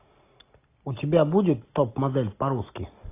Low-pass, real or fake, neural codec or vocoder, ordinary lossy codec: 3.6 kHz; real; none; AAC, 24 kbps